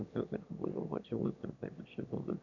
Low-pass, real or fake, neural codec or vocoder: 7.2 kHz; fake; autoencoder, 22.05 kHz, a latent of 192 numbers a frame, VITS, trained on one speaker